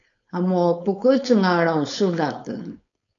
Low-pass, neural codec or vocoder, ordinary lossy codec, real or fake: 7.2 kHz; codec, 16 kHz, 4.8 kbps, FACodec; AAC, 64 kbps; fake